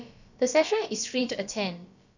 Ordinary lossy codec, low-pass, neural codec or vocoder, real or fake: none; 7.2 kHz; codec, 16 kHz, about 1 kbps, DyCAST, with the encoder's durations; fake